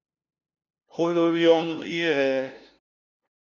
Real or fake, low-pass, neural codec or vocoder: fake; 7.2 kHz; codec, 16 kHz, 0.5 kbps, FunCodec, trained on LibriTTS, 25 frames a second